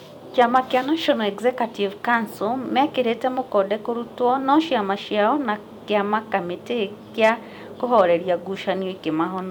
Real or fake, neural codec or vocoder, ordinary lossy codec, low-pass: fake; vocoder, 44.1 kHz, 128 mel bands every 256 samples, BigVGAN v2; none; 19.8 kHz